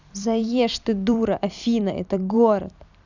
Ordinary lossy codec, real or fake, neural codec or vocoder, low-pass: none; fake; vocoder, 44.1 kHz, 128 mel bands every 256 samples, BigVGAN v2; 7.2 kHz